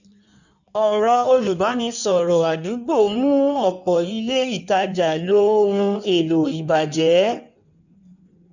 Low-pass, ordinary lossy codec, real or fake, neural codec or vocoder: 7.2 kHz; none; fake; codec, 16 kHz in and 24 kHz out, 1.1 kbps, FireRedTTS-2 codec